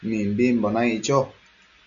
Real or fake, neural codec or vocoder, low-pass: real; none; 7.2 kHz